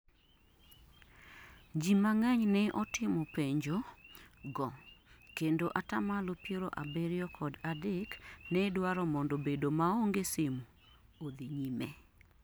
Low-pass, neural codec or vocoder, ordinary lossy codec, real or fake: none; none; none; real